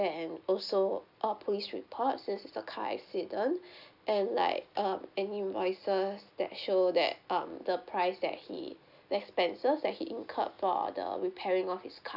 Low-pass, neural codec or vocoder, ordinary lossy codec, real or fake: 5.4 kHz; none; none; real